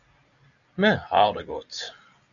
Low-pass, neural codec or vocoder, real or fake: 7.2 kHz; none; real